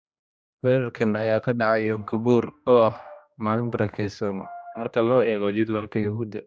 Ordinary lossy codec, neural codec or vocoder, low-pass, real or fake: none; codec, 16 kHz, 1 kbps, X-Codec, HuBERT features, trained on general audio; none; fake